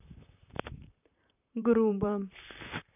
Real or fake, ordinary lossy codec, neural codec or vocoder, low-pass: fake; none; vocoder, 44.1 kHz, 128 mel bands, Pupu-Vocoder; 3.6 kHz